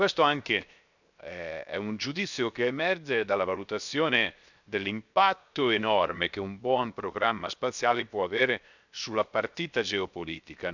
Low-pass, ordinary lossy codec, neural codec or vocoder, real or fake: 7.2 kHz; none; codec, 16 kHz, 0.7 kbps, FocalCodec; fake